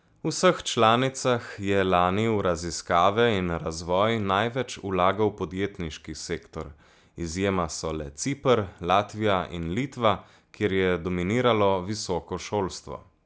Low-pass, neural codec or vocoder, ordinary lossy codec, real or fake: none; none; none; real